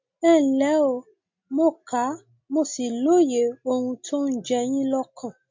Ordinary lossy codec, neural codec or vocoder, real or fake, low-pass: MP3, 48 kbps; none; real; 7.2 kHz